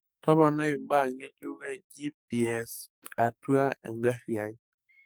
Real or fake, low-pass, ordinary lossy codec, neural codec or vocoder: fake; none; none; codec, 44.1 kHz, 2.6 kbps, SNAC